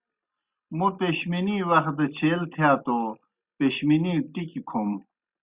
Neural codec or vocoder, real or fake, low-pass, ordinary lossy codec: none; real; 3.6 kHz; Opus, 64 kbps